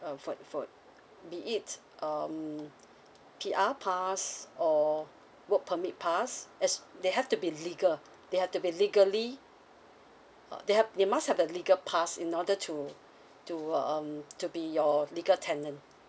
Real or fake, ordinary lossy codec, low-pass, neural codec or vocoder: real; none; none; none